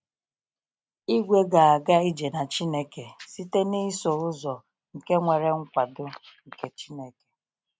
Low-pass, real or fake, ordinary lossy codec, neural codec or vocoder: none; real; none; none